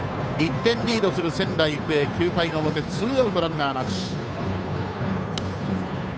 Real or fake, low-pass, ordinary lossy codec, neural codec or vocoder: fake; none; none; codec, 16 kHz, 2 kbps, FunCodec, trained on Chinese and English, 25 frames a second